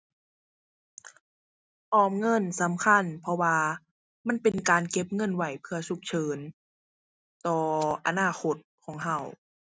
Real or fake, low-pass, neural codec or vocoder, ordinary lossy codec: real; none; none; none